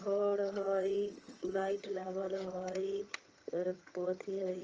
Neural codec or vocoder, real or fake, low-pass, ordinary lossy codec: vocoder, 22.05 kHz, 80 mel bands, HiFi-GAN; fake; 7.2 kHz; Opus, 24 kbps